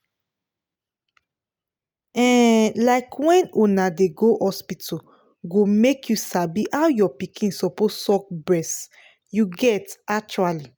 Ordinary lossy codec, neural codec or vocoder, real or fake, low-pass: none; none; real; none